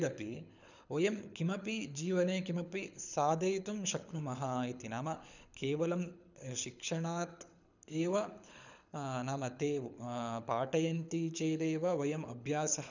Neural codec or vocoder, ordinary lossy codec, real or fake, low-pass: codec, 24 kHz, 6 kbps, HILCodec; none; fake; 7.2 kHz